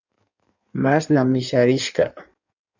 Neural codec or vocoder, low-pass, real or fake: codec, 16 kHz in and 24 kHz out, 1.1 kbps, FireRedTTS-2 codec; 7.2 kHz; fake